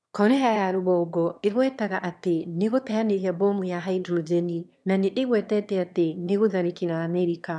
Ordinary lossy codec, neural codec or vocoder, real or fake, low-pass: none; autoencoder, 22.05 kHz, a latent of 192 numbers a frame, VITS, trained on one speaker; fake; none